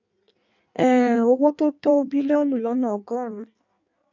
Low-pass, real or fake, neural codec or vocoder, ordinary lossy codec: 7.2 kHz; fake; codec, 16 kHz in and 24 kHz out, 1.1 kbps, FireRedTTS-2 codec; none